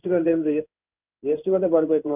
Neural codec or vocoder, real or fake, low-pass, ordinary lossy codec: codec, 16 kHz in and 24 kHz out, 1 kbps, XY-Tokenizer; fake; 3.6 kHz; none